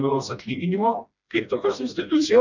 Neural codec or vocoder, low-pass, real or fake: codec, 16 kHz, 1 kbps, FreqCodec, smaller model; 7.2 kHz; fake